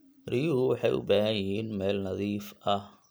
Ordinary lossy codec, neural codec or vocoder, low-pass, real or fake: none; vocoder, 44.1 kHz, 128 mel bands every 256 samples, BigVGAN v2; none; fake